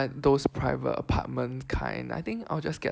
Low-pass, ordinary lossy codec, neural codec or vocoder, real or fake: none; none; none; real